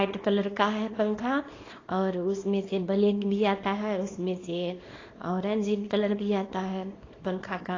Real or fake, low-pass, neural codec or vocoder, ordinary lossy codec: fake; 7.2 kHz; codec, 24 kHz, 0.9 kbps, WavTokenizer, small release; AAC, 32 kbps